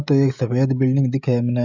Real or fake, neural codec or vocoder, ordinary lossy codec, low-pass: fake; codec, 16 kHz, 16 kbps, FreqCodec, smaller model; none; 7.2 kHz